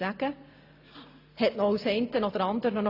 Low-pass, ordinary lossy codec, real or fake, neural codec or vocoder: 5.4 kHz; AAC, 32 kbps; real; none